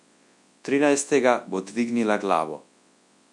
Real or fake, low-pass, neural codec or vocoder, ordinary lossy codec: fake; 10.8 kHz; codec, 24 kHz, 0.9 kbps, WavTokenizer, large speech release; MP3, 64 kbps